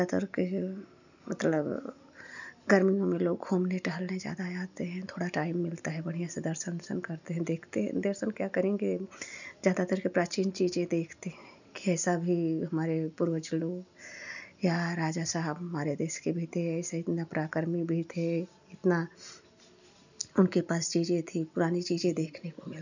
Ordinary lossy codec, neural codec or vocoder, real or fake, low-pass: none; none; real; 7.2 kHz